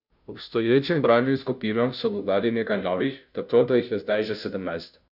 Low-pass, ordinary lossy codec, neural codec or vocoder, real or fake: 5.4 kHz; none; codec, 16 kHz, 0.5 kbps, FunCodec, trained on Chinese and English, 25 frames a second; fake